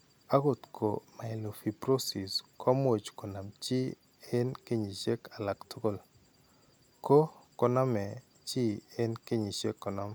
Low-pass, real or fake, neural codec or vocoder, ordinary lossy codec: none; real; none; none